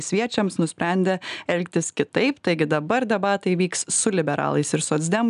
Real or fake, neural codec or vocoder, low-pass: real; none; 10.8 kHz